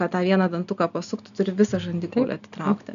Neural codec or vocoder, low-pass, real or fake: none; 7.2 kHz; real